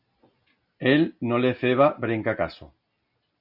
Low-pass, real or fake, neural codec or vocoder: 5.4 kHz; real; none